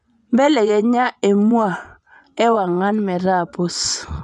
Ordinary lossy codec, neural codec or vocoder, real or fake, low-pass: none; vocoder, 24 kHz, 100 mel bands, Vocos; fake; 10.8 kHz